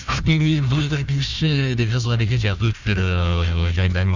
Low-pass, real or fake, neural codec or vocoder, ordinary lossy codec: 7.2 kHz; fake; codec, 16 kHz, 1 kbps, FunCodec, trained on Chinese and English, 50 frames a second; none